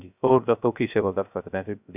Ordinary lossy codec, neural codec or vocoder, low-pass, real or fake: none; codec, 16 kHz, 0.3 kbps, FocalCodec; 3.6 kHz; fake